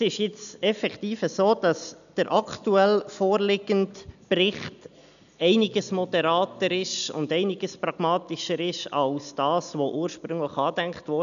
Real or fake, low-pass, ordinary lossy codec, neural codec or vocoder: real; 7.2 kHz; none; none